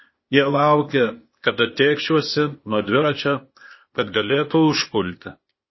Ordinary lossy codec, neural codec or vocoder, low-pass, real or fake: MP3, 24 kbps; codec, 16 kHz, 0.8 kbps, ZipCodec; 7.2 kHz; fake